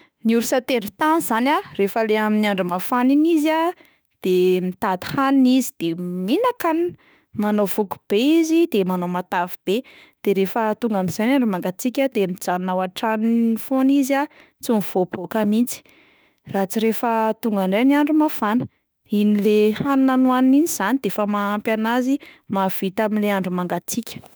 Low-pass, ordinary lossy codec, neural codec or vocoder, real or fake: none; none; autoencoder, 48 kHz, 32 numbers a frame, DAC-VAE, trained on Japanese speech; fake